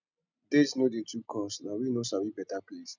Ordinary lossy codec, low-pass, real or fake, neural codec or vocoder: none; 7.2 kHz; real; none